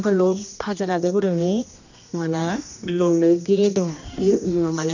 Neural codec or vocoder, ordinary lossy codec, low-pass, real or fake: codec, 16 kHz, 1 kbps, X-Codec, HuBERT features, trained on general audio; none; 7.2 kHz; fake